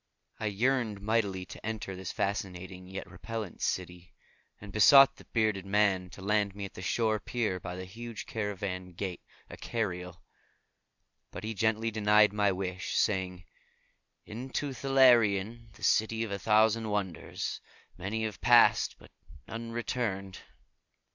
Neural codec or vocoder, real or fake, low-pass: none; real; 7.2 kHz